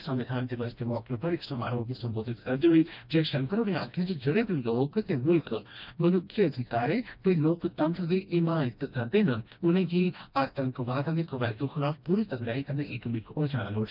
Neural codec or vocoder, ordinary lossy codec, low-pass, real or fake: codec, 16 kHz, 1 kbps, FreqCodec, smaller model; none; 5.4 kHz; fake